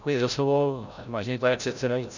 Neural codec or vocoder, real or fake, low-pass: codec, 16 kHz, 0.5 kbps, FreqCodec, larger model; fake; 7.2 kHz